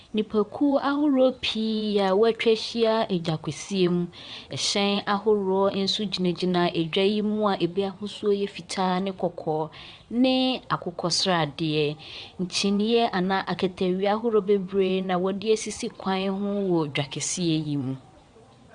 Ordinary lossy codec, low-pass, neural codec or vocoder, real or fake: Opus, 64 kbps; 9.9 kHz; vocoder, 22.05 kHz, 80 mel bands, WaveNeXt; fake